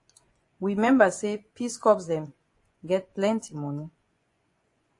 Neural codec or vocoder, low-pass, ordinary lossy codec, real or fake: vocoder, 24 kHz, 100 mel bands, Vocos; 10.8 kHz; AAC, 48 kbps; fake